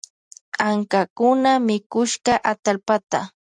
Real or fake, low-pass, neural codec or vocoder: fake; 9.9 kHz; vocoder, 44.1 kHz, 128 mel bands every 256 samples, BigVGAN v2